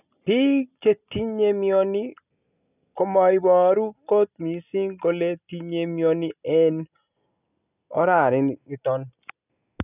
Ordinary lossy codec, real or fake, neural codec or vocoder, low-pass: none; real; none; 3.6 kHz